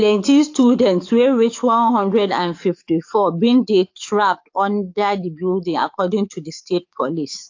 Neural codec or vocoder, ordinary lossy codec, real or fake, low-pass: codec, 44.1 kHz, 7.8 kbps, DAC; AAC, 48 kbps; fake; 7.2 kHz